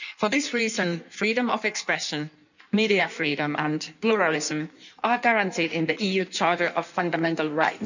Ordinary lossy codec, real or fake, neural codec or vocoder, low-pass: none; fake; codec, 16 kHz in and 24 kHz out, 1.1 kbps, FireRedTTS-2 codec; 7.2 kHz